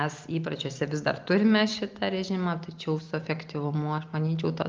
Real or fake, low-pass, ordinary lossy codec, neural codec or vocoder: real; 7.2 kHz; Opus, 24 kbps; none